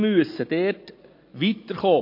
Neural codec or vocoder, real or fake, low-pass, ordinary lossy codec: none; real; 5.4 kHz; MP3, 32 kbps